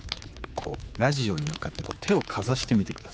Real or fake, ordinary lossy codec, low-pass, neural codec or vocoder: fake; none; none; codec, 16 kHz, 4 kbps, X-Codec, HuBERT features, trained on general audio